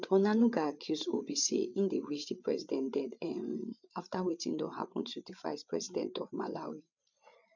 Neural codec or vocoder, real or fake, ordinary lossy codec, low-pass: codec, 16 kHz, 8 kbps, FreqCodec, larger model; fake; none; 7.2 kHz